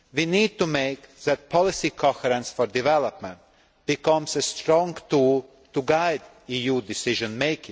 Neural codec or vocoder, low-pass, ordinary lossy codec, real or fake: none; none; none; real